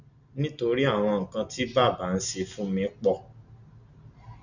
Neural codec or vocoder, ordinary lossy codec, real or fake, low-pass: none; none; real; 7.2 kHz